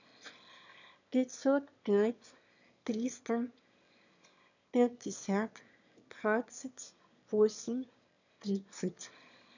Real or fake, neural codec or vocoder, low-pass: fake; autoencoder, 22.05 kHz, a latent of 192 numbers a frame, VITS, trained on one speaker; 7.2 kHz